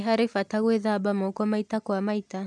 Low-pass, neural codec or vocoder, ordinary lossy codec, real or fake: none; none; none; real